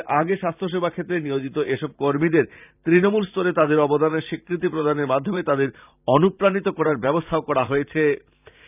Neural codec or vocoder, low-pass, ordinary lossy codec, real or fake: vocoder, 44.1 kHz, 128 mel bands every 512 samples, BigVGAN v2; 3.6 kHz; none; fake